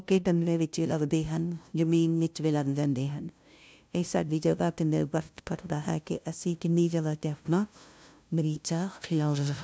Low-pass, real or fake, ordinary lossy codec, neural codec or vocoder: none; fake; none; codec, 16 kHz, 0.5 kbps, FunCodec, trained on LibriTTS, 25 frames a second